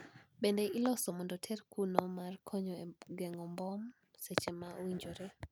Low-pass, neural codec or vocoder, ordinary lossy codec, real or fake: none; none; none; real